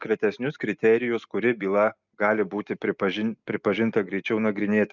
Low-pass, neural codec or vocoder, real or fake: 7.2 kHz; none; real